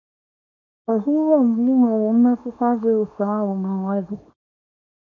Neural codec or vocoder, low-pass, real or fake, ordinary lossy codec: codec, 24 kHz, 0.9 kbps, WavTokenizer, small release; 7.2 kHz; fake; none